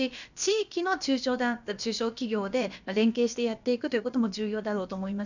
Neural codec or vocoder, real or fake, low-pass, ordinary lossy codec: codec, 16 kHz, about 1 kbps, DyCAST, with the encoder's durations; fake; 7.2 kHz; none